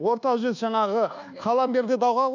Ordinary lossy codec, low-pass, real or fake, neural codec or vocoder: none; 7.2 kHz; fake; codec, 24 kHz, 1.2 kbps, DualCodec